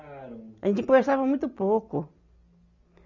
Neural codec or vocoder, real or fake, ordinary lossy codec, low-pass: none; real; none; 7.2 kHz